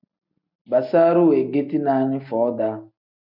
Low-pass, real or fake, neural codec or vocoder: 5.4 kHz; real; none